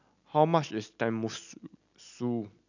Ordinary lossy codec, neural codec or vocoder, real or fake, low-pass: none; none; real; 7.2 kHz